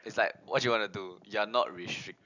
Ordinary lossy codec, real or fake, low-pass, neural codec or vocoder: none; real; 7.2 kHz; none